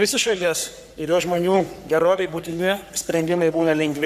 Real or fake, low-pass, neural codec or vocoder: fake; 14.4 kHz; codec, 44.1 kHz, 3.4 kbps, Pupu-Codec